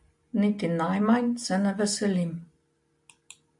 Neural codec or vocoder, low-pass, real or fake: none; 10.8 kHz; real